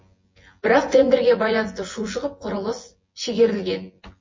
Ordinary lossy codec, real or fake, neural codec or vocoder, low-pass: MP3, 32 kbps; fake; vocoder, 24 kHz, 100 mel bands, Vocos; 7.2 kHz